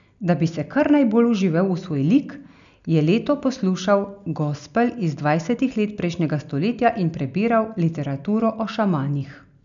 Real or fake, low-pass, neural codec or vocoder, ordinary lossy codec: real; 7.2 kHz; none; none